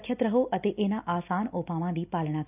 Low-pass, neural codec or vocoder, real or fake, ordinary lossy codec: 3.6 kHz; none; real; none